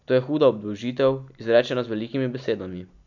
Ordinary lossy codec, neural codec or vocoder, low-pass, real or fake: none; none; 7.2 kHz; real